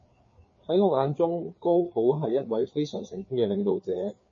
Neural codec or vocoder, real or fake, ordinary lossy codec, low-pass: codec, 16 kHz, 4 kbps, FreqCodec, larger model; fake; MP3, 32 kbps; 7.2 kHz